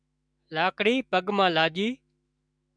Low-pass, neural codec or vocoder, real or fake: 9.9 kHz; autoencoder, 48 kHz, 128 numbers a frame, DAC-VAE, trained on Japanese speech; fake